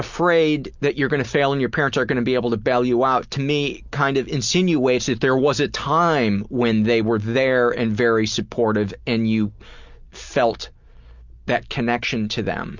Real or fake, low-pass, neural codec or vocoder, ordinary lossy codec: real; 7.2 kHz; none; Opus, 64 kbps